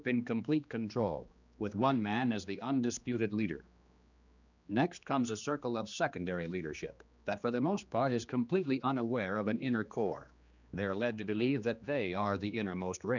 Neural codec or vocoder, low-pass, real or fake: codec, 16 kHz, 2 kbps, X-Codec, HuBERT features, trained on general audio; 7.2 kHz; fake